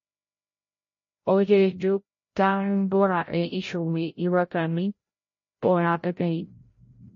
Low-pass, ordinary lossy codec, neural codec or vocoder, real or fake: 7.2 kHz; MP3, 32 kbps; codec, 16 kHz, 0.5 kbps, FreqCodec, larger model; fake